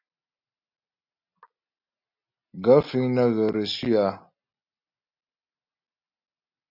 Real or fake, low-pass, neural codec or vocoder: real; 5.4 kHz; none